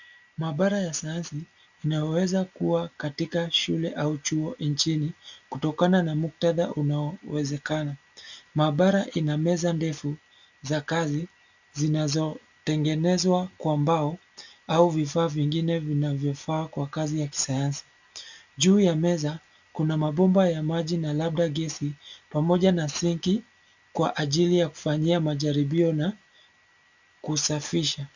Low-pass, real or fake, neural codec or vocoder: 7.2 kHz; real; none